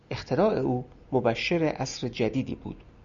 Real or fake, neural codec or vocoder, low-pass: real; none; 7.2 kHz